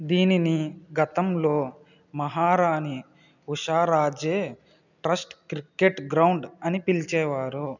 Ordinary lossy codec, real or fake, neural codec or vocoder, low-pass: none; real; none; 7.2 kHz